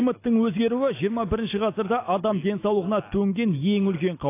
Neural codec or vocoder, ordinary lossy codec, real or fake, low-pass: none; AAC, 24 kbps; real; 3.6 kHz